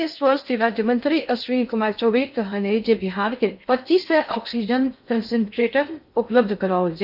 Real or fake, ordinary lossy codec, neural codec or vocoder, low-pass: fake; none; codec, 16 kHz in and 24 kHz out, 0.6 kbps, FocalCodec, streaming, 2048 codes; 5.4 kHz